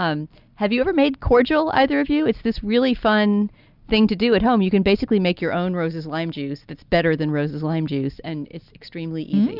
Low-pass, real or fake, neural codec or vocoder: 5.4 kHz; real; none